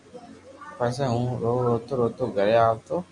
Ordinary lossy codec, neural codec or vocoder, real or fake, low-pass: MP3, 96 kbps; none; real; 10.8 kHz